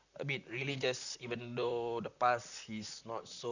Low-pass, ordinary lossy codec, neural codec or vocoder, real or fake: 7.2 kHz; none; vocoder, 44.1 kHz, 128 mel bands, Pupu-Vocoder; fake